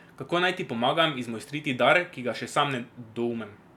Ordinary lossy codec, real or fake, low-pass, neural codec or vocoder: none; real; 19.8 kHz; none